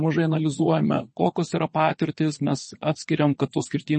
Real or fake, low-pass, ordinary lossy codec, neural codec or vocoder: fake; 9.9 kHz; MP3, 32 kbps; vocoder, 22.05 kHz, 80 mel bands, WaveNeXt